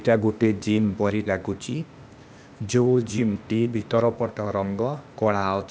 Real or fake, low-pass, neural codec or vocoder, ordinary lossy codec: fake; none; codec, 16 kHz, 0.8 kbps, ZipCodec; none